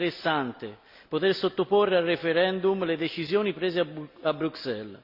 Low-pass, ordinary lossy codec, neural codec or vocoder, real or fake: 5.4 kHz; Opus, 64 kbps; none; real